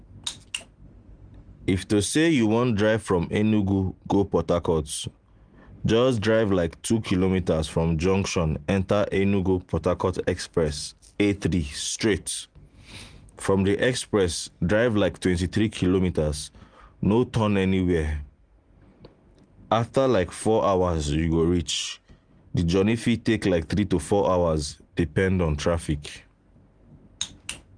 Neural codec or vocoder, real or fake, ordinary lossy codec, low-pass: none; real; Opus, 24 kbps; 9.9 kHz